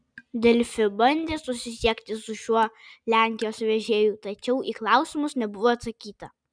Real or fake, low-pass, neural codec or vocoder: real; 9.9 kHz; none